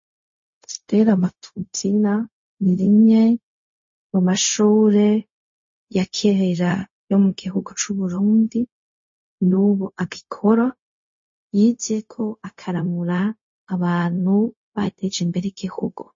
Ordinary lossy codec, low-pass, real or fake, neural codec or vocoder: MP3, 32 kbps; 7.2 kHz; fake; codec, 16 kHz, 0.4 kbps, LongCat-Audio-Codec